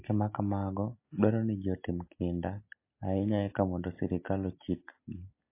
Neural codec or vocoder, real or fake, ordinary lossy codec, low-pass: none; real; MP3, 24 kbps; 3.6 kHz